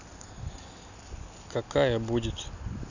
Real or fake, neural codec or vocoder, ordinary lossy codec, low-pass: real; none; none; 7.2 kHz